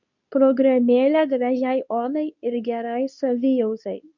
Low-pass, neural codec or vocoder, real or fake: 7.2 kHz; codec, 24 kHz, 0.9 kbps, WavTokenizer, medium speech release version 2; fake